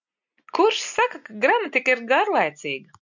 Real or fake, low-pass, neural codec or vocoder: real; 7.2 kHz; none